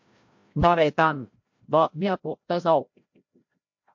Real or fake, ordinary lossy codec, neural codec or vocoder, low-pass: fake; MP3, 48 kbps; codec, 16 kHz, 0.5 kbps, FreqCodec, larger model; 7.2 kHz